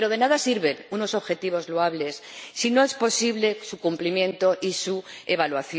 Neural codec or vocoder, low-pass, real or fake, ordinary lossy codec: none; none; real; none